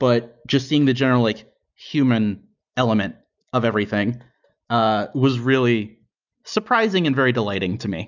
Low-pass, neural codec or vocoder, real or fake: 7.2 kHz; none; real